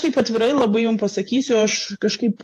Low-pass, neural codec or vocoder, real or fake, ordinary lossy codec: 14.4 kHz; vocoder, 48 kHz, 128 mel bands, Vocos; fake; AAC, 64 kbps